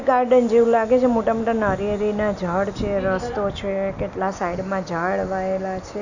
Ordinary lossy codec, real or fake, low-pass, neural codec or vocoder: none; real; 7.2 kHz; none